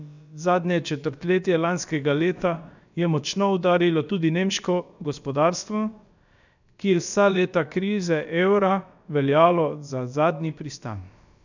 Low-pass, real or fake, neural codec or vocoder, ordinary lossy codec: 7.2 kHz; fake; codec, 16 kHz, about 1 kbps, DyCAST, with the encoder's durations; none